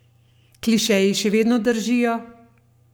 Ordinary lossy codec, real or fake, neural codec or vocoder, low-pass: none; fake; codec, 44.1 kHz, 7.8 kbps, Pupu-Codec; none